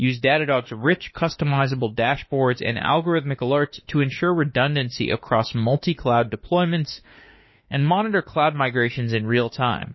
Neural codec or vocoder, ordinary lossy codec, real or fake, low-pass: autoencoder, 48 kHz, 32 numbers a frame, DAC-VAE, trained on Japanese speech; MP3, 24 kbps; fake; 7.2 kHz